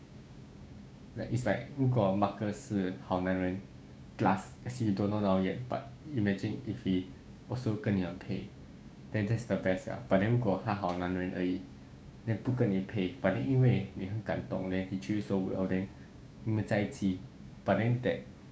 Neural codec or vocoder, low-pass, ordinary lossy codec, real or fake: codec, 16 kHz, 6 kbps, DAC; none; none; fake